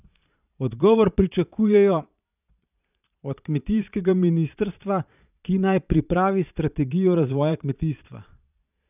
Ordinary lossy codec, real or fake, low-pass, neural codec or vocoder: none; fake; 3.6 kHz; vocoder, 22.05 kHz, 80 mel bands, Vocos